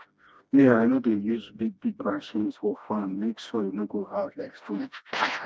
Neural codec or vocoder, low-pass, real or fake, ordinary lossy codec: codec, 16 kHz, 1 kbps, FreqCodec, smaller model; none; fake; none